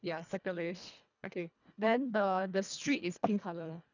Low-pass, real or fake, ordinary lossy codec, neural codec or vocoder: 7.2 kHz; fake; none; codec, 24 kHz, 1.5 kbps, HILCodec